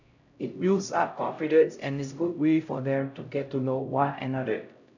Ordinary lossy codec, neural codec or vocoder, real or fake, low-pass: none; codec, 16 kHz, 0.5 kbps, X-Codec, HuBERT features, trained on LibriSpeech; fake; 7.2 kHz